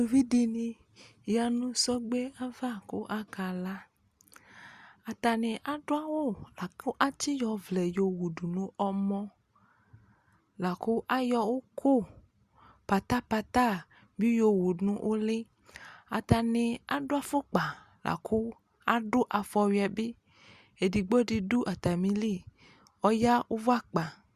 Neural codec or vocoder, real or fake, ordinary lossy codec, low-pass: none; real; Opus, 64 kbps; 14.4 kHz